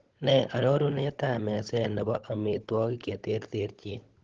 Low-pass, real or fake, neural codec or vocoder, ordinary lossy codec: 7.2 kHz; fake; codec, 16 kHz, 16 kbps, FunCodec, trained on LibriTTS, 50 frames a second; Opus, 16 kbps